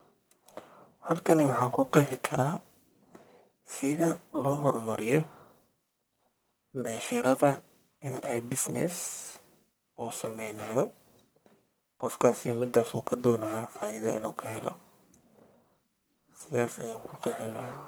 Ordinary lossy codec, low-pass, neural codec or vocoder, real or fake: none; none; codec, 44.1 kHz, 1.7 kbps, Pupu-Codec; fake